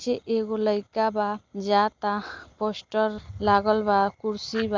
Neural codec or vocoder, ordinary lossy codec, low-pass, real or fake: none; Opus, 24 kbps; 7.2 kHz; real